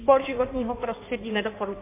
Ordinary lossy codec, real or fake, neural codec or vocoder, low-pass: MP3, 24 kbps; fake; codec, 16 kHz in and 24 kHz out, 1.1 kbps, FireRedTTS-2 codec; 3.6 kHz